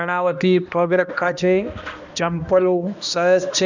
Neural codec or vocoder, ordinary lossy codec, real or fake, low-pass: codec, 16 kHz, 1 kbps, X-Codec, HuBERT features, trained on balanced general audio; none; fake; 7.2 kHz